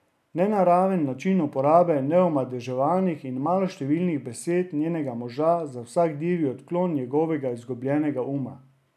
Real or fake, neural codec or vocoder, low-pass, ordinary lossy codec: real; none; 14.4 kHz; none